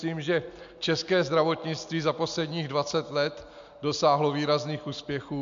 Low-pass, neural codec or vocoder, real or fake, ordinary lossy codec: 7.2 kHz; none; real; MP3, 64 kbps